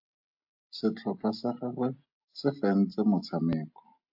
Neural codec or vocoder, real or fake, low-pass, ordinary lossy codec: none; real; 5.4 kHz; AAC, 48 kbps